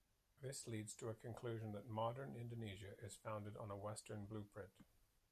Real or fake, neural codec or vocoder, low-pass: real; none; 14.4 kHz